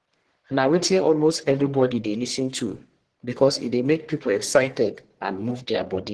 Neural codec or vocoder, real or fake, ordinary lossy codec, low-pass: codec, 44.1 kHz, 1.7 kbps, Pupu-Codec; fake; Opus, 16 kbps; 10.8 kHz